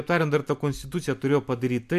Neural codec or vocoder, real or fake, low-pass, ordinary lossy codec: none; real; 14.4 kHz; MP3, 96 kbps